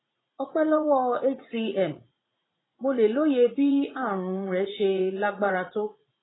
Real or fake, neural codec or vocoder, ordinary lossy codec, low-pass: fake; vocoder, 44.1 kHz, 80 mel bands, Vocos; AAC, 16 kbps; 7.2 kHz